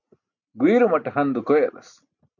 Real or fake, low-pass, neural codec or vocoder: real; 7.2 kHz; none